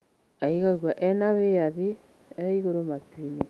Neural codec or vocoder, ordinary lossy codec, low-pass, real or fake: autoencoder, 48 kHz, 128 numbers a frame, DAC-VAE, trained on Japanese speech; Opus, 32 kbps; 19.8 kHz; fake